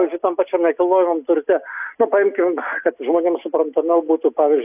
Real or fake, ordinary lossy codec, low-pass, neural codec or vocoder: real; AAC, 32 kbps; 3.6 kHz; none